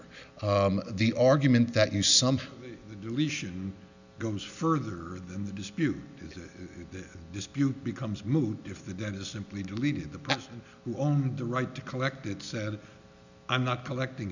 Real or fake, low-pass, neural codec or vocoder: real; 7.2 kHz; none